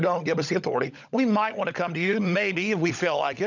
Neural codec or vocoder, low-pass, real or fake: codec, 16 kHz, 16 kbps, FunCodec, trained on LibriTTS, 50 frames a second; 7.2 kHz; fake